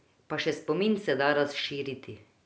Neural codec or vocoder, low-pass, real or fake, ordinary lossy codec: none; none; real; none